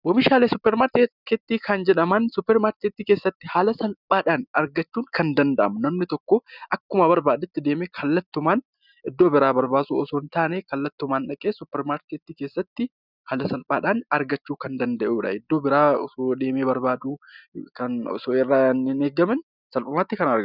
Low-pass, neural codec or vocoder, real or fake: 5.4 kHz; none; real